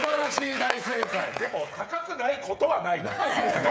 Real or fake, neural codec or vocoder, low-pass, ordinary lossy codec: fake; codec, 16 kHz, 8 kbps, FreqCodec, smaller model; none; none